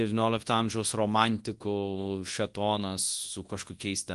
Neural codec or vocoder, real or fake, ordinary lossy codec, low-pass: codec, 24 kHz, 0.9 kbps, WavTokenizer, large speech release; fake; Opus, 24 kbps; 10.8 kHz